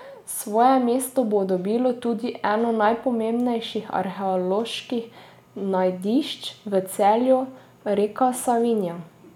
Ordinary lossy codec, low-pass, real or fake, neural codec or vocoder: none; 19.8 kHz; real; none